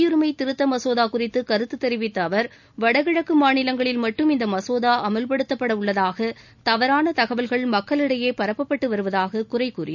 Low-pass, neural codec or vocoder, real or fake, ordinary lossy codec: 7.2 kHz; none; real; none